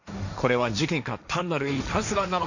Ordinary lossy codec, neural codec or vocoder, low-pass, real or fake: none; codec, 16 kHz, 1.1 kbps, Voila-Tokenizer; 7.2 kHz; fake